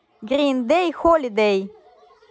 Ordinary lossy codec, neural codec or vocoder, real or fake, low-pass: none; none; real; none